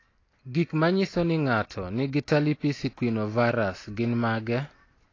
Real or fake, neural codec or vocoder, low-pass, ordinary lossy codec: real; none; 7.2 kHz; AAC, 32 kbps